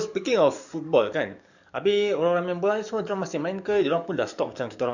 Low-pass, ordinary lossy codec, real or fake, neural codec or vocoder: 7.2 kHz; none; fake; codec, 44.1 kHz, 7.8 kbps, DAC